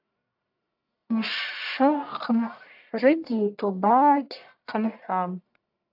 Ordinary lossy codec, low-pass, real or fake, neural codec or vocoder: AAC, 48 kbps; 5.4 kHz; fake; codec, 44.1 kHz, 1.7 kbps, Pupu-Codec